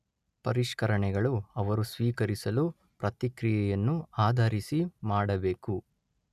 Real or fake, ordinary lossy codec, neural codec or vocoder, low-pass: real; none; none; 14.4 kHz